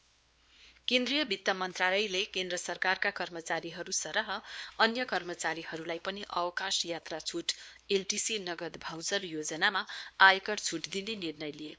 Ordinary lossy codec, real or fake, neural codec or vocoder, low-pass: none; fake; codec, 16 kHz, 2 kbps, X-Codec, WavLM features, trained on Multilingual LibriSpeech; none